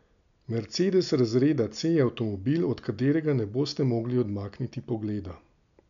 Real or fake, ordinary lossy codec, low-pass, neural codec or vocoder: real; none; 7.2 kHz; none